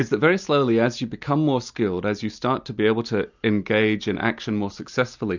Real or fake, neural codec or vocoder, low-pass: real; none; 7.2 kHz